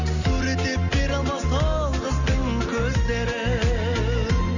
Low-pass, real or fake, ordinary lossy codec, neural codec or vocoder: 7.2 kHz; real; none; none